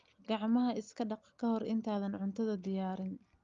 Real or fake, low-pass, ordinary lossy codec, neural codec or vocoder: real; 7.2 kHz; Opus, 32 kbps; none